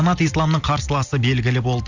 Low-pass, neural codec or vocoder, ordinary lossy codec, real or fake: 7.2 kHz; none; Opus, 64 kbps; real